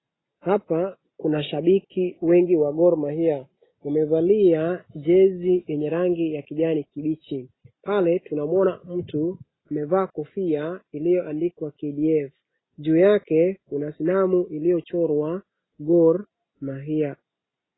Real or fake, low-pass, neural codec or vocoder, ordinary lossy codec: real; 7.2 kHz; none; AAC, 16 kbps